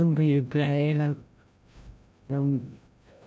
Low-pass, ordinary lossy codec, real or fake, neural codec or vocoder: none; none; fake; codec, 16 kHz, 0.5 kbps, FreqCodec, larger model